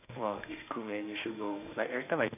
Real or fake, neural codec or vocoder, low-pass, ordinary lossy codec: fake; autoencoder, 48 kHz, 32 numbers a frame, DAC-VAE, trained on Japanese speech; 3.6 kHz; none